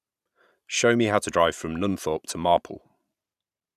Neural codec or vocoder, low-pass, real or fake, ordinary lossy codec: vocoder, 44.1 kHz, 128 mel bands every 512 samples, BigVGAN v2; 14.4 kHz; fake; none